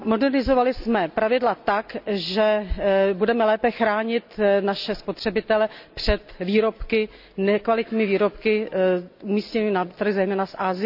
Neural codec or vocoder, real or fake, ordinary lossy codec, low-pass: none; real; none; 5.4 kHz